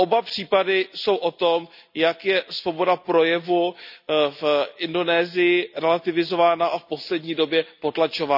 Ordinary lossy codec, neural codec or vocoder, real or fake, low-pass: none; none; real; 5.4 kHz